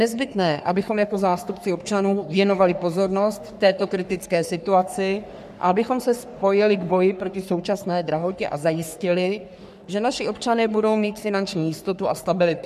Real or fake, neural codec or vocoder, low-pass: fake; codec, 44.1 kHz, 3.4 kbps, Pupu-Codec; 14.4 kHz